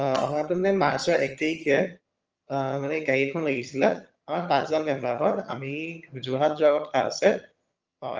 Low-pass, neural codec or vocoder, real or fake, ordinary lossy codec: 7.2 kHz; vocoder, 22.05 kHz, 80 mel bands, HiFi-GAN; fake; Opus, 24 kbps